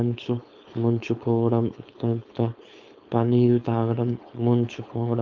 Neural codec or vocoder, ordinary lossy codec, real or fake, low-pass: codec, 16 kHz, 4.8 kbps, FACodec; Opus, 24 kbps; fake; 7.2 kHz